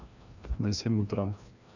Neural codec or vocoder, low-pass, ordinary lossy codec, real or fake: codec, 16 kHz, 1 kbps, FreqCodec, larger model; 7.2 kHz; none; fake